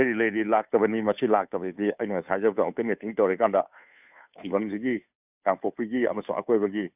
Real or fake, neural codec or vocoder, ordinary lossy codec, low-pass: fake; codec, 16 kHz, 2 kbps, FunCodec, trained on Chinese and English, 25 frames a second; none; 3.6 kHz